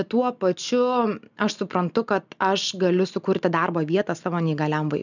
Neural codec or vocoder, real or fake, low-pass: none; real; 7.2 kHz